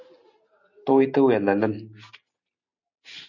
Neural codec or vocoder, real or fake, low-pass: none; real; 7.2 kHz